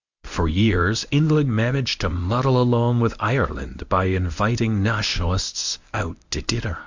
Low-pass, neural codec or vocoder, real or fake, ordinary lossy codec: 7.2 kHz; codec, 24 kHz, 0.9 kbps, WavTokenizer, medium speech release version 1; fake; Opus, 64 kbps